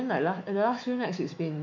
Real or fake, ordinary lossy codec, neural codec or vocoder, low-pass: fake; MP3, 64 kbps; autoencoder, 48 kHz, 128 numbers a frame, DAC-VAE, trained on Japanese speech; 7.2 kHz